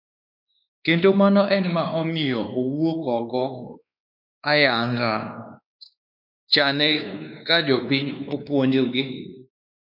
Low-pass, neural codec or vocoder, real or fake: 5.4 kHz; codec, 16 kHz, 2 kbps, X-Codec, WavLM features, trained on Multilingual LibriSpeech; fake